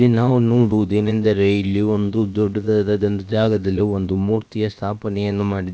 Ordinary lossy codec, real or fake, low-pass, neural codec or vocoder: none; fake; none; codec, 16 kHz, about 1 kbps, DyCAST, with the encoder's durations